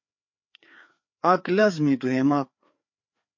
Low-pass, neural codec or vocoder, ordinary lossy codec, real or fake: 7.2 kHz; codec, 16 kHz, 4 kbps, FreqCodec, larger model; MP3, 48 kbps; fake